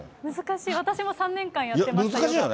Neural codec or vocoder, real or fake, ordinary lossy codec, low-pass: none; real; none; none